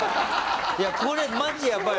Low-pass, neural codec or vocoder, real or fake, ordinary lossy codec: none; none; real; none